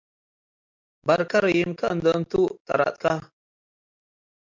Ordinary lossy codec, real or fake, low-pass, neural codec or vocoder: MP3, 64 kbps; real; 7.2 kHz; none